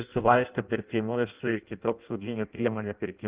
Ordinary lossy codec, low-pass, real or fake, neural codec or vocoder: Opus, 32 kbps; 3.6 kHz; fake; codec, 16 kHz in and 24 kHz out, 0.6 kbps, FireRedTTS-2 codec